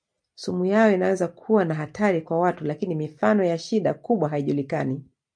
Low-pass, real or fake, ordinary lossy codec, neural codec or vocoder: 9.9 kHz; real; MP3, 64 kbps; none